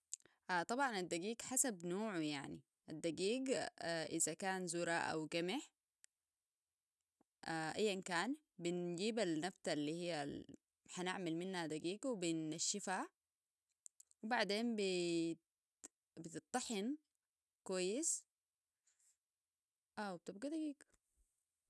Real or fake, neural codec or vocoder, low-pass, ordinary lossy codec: real; none; none; none